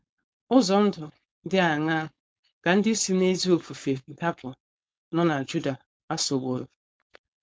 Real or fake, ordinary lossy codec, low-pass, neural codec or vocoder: fake; none; none; codec, 16 kHz, 4.8 kbps, FACodec